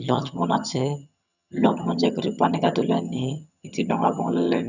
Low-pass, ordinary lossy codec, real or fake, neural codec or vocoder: 7.2 kHz; none; fake; vocoder, 22.05 kHz, 80 mel bands, HiFi-GAN